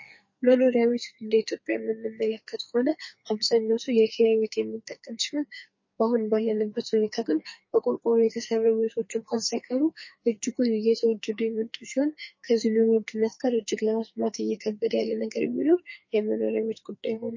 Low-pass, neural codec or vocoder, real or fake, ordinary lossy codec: 7.2 kHz; codec, 44.1 kHz, 2.6 kbps, SNAC; fake; MP3, 32 kbps